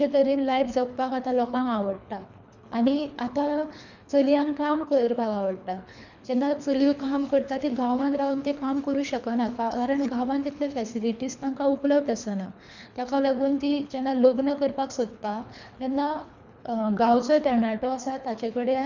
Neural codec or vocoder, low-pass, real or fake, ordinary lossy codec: codec, 24 kHz, 3 kbps, HILCodec; 7.2 kHz; fake; none